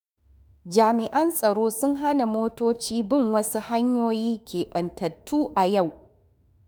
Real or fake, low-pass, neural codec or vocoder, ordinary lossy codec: fake; none; autoencoder, 48 kHz, 32 numbers a frame, DAC-VAE, trained on Japanese speech; none